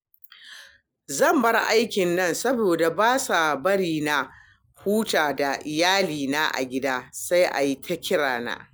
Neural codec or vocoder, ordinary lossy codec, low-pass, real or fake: none; none; none; real